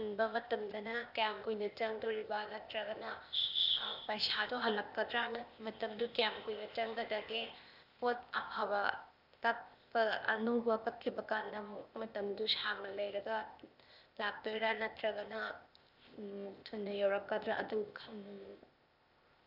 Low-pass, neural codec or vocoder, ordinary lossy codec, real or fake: 5.4 kHz; codec, 16 kHz, 0.8 kbps, ZipCodec; none; fake